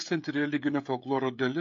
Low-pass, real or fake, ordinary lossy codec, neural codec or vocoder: 7.2 kHz; fake; AAC, 48 kbps; codec, 16 kHz, 16 kbps, FreqCodec, smaller model